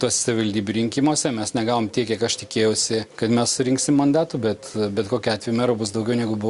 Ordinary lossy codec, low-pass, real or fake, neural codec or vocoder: Opus, 64 kbps; 10.8 kHz; real; none